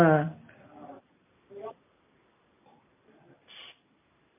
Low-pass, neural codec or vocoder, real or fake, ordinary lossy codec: 3.6 kHz; none; real; MP3, 32 kbps